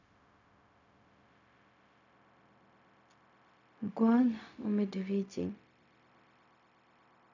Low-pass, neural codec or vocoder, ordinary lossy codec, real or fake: 7.2 kHz; codec, 16 kHz, 0.4 kbps, LongCat-Audio-Codec; none; fake